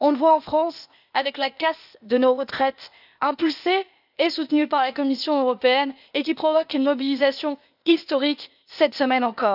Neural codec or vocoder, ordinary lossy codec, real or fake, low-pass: codec, 16 kHz, 0.8 kbps, ZipCodec; none; fake; 5.4 kHz